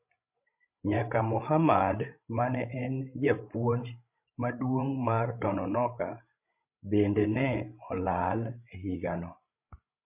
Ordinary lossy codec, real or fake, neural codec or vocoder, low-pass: MP3, 32 kbps; fake; codec, 16 kHz, 16 kbps, FreqCodec, larger model; 3.6 kHz